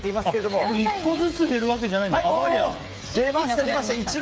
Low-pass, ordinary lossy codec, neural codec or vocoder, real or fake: none; none; codec, 16 kHz, 8 kbps, FreqCodec, smaller model; fake